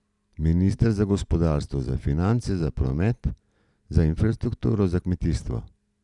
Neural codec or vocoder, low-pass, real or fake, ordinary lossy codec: none; 10.8 kHz; real; none